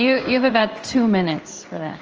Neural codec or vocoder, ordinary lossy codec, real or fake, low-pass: codec, 16 kHz in and 24 kHz out, 1 kbps, XY-Tokenizer; Opus, 24 kbps; fake; 7.2 kHz